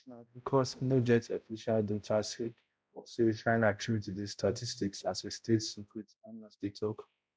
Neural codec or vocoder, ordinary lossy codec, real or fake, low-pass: codec, 16 kHz, 0.5 kbps, X-Codec, HuBERT features, trained on balanced general audio; none; fake; none